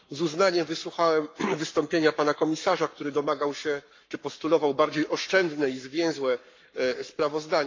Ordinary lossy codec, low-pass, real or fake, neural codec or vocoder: MP3, 48 kbps; 7.2 kHz; fake; codec, 44.1 kHz, 7.8 kbps, Pupu-Codec